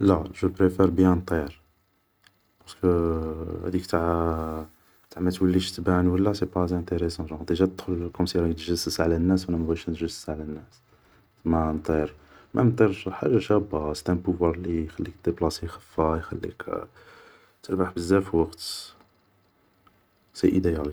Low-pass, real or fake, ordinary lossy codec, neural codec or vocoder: none; real; none; none